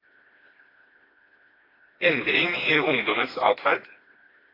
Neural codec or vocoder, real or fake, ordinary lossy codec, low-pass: codec, 16 kHz, 2 kbps, FreqCodec, smaller model; fake; AAC, 24 kbps; 5.4 kHz